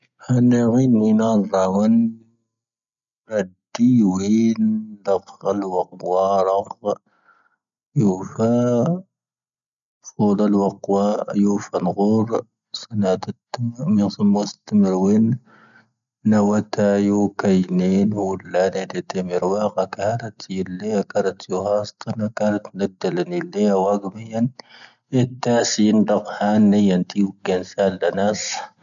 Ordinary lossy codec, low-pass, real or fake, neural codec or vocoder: none; 7.2 kHz; real; none